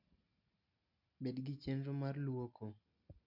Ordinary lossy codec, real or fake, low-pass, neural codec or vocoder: none; real; 5.4 kHz; none